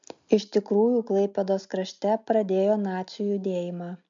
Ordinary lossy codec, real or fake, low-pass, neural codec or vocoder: MP3, 64 kbps; real; 7.2 kHz; none